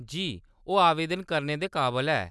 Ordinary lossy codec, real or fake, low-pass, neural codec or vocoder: none; real; none; none